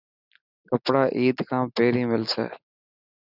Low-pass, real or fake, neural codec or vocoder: 5.4 kHz; real; none